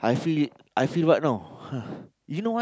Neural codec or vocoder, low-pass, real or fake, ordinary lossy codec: none; none; real; none